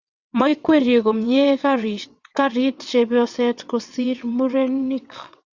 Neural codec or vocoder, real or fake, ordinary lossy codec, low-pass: vocoder, 22.05 kHz, 80 mel bands, WaveNeXt; fake; Opus, 64 kbps; 7.2 kHz